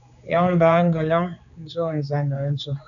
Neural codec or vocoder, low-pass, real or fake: codec, 16 kHz, 4 kbps, X-Codec, HuBERT features, trained on general audio; 7.2 kHz; fake